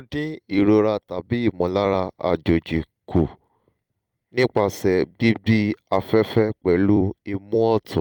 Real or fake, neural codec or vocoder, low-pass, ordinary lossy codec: fake; vocoder, 44.1 kHz, 128 mel bands every 256 samples, BigVGAN v2; 19.8 kHz; Opus, 32 kbps